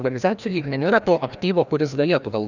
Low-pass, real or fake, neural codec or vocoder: 7.2 kHz; fake; codec, 16 kHz, 1 kbps, FreqCodec, larger model